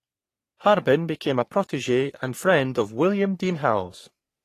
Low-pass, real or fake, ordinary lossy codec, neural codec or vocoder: 14.4 kHz; fake; AAC, 48 kbps; codec, 44.1 kHz, 3.4 kbps, Pupu-Codec